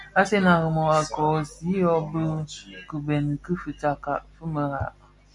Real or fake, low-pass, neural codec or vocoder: real; 10.8 kHz; none